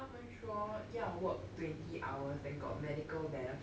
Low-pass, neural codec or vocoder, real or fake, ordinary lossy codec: none; none; real; none